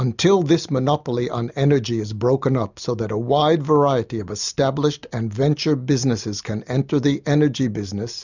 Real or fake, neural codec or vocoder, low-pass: real; none; 7.2 kHz